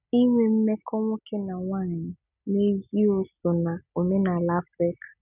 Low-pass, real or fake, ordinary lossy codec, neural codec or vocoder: 3.6 kHz; real; none; none